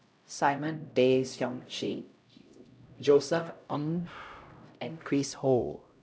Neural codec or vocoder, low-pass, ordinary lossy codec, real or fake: codec, 16 kHz, 0.5 kbps, X-Codec, HuBERT features, trained on LibriSpeech; none; none; fake